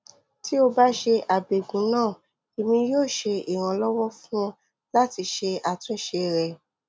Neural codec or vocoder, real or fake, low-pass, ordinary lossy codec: none; real; none; none